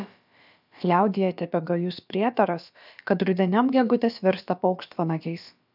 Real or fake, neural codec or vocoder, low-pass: fake; codec, 16 kHz, about 1 kbps, DyCAST, with the encoder's durations; 5.4 kHz